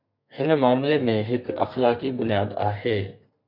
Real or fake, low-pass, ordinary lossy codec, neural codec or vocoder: fake; 5.4 kHz; MP3, 48 kbps; codec, 32 kHz, 1.9 kbps, SNAC